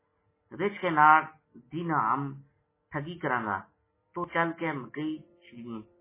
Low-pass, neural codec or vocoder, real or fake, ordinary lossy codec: 3.6 kHz; none; real; MP3, 16 kbps